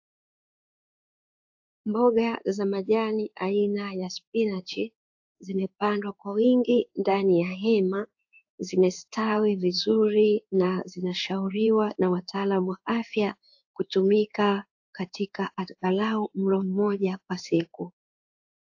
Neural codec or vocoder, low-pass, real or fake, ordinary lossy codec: codec, 16 kHz in and 24 kHz out, 1 kbps, XY-Tokenizer; 7.2 kHz; fake; AAC, 48 kbps